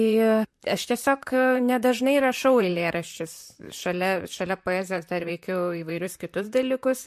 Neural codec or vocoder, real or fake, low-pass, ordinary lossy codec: vocoder, 44.1 kHz, 128 mel bands, Pupu-Vocoder; fake; 14.4 kHz; MP3, 64 kbps